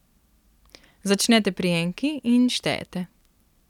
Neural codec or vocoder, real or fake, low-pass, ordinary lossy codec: none; real; 19.8 kHz; none